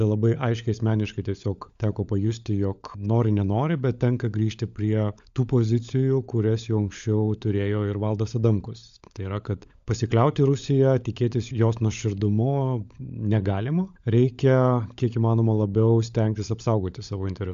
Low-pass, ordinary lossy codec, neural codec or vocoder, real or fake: 7.2 kHz; MP3, 64 kbps; codec, 16 kHz, 16 kbps, FunCodec, trained on LibriTTS, 50 frames a second; fake